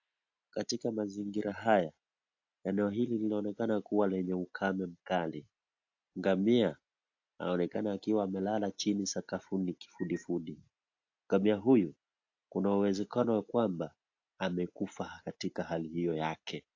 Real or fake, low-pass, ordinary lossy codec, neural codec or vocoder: real; 7.2 kHz; AAC, 48 kbps; none